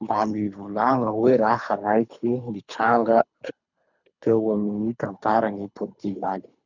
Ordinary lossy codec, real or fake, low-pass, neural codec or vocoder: none; fake; 7.2 kHz; codec, 24 kHz, 3 kbps, HILCodec